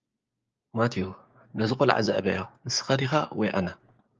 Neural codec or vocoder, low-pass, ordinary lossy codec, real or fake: codec, 16 kHz, 6 kbps, DAC; 7.2 kHz; Opus, 24 kbps; fake